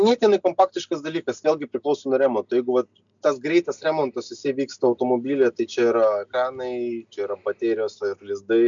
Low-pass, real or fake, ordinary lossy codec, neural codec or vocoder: 7.2 kHz; real; MP3, 64 kbps; none